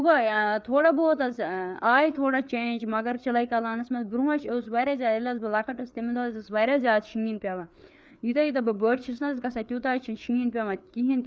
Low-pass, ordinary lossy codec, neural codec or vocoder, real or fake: none; none; codec, 16 kHz, 4 kbps, FreqCodec, larger model; fake